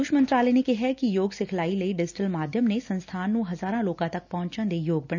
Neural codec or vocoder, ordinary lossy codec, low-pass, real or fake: none; none; 7.2 kHz; real